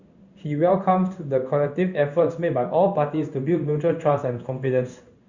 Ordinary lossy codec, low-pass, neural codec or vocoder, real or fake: Opus, 64 kbps; 7.2 kHz; codec, 16 kHz in and 24 kHz out, 1 kbps, XY-Tokenizer; fake